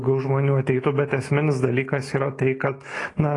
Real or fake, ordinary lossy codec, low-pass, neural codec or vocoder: fake; AAC, 32 kbps; 10.8 kHz; autoencoder, 48 kHz, 128 numbers a frame, DAC-VAE, trained on Japanese speech